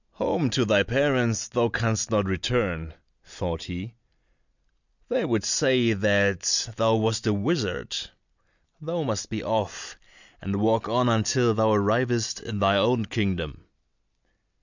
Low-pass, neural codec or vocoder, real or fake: 7.2 kHz; none; real